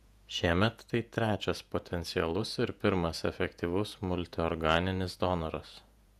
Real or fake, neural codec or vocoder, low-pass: fake; vocoder, 48 kHz, 128 mel bands, Vocos; 14.4 kHz